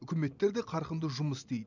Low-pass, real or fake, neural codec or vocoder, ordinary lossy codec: 7.2 kHz; real; none; none